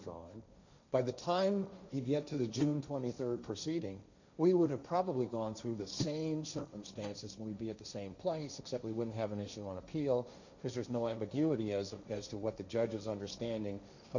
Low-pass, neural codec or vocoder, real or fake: 7.2 kHz; codec, 16 kHz, 1.1 kbps, Voila-Tokenizer; fake